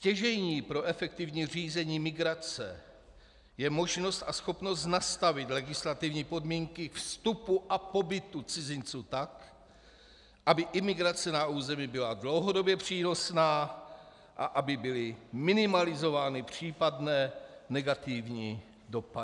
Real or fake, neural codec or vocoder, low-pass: real; none; 10.8 kHz